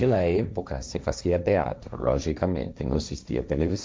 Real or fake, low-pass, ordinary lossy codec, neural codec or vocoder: fake; none; none; codec, 16 kHz, 1.1 kbps, Voila-Tokenizer